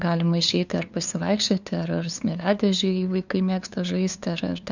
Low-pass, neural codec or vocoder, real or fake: 7.2 kHz; codec, 16 kHz, 2 kbps, FunCodec, trained on LibriTTS, 25 frames a second; fake